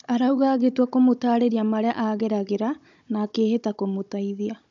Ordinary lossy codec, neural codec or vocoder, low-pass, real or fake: MP3, 64 kbps; codec, 16 kHz, 16 kbps, FunCodec, trained on Chinese and English, 50 frames a second; 7.2 kHz; fake